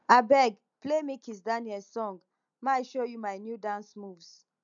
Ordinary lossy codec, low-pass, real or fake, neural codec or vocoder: MP3, 96 kbps; 7.2 kHz; real; none